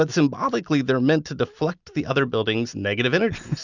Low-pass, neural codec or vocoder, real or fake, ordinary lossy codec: 7.2 kHz; none; real; Opus, 64 kbps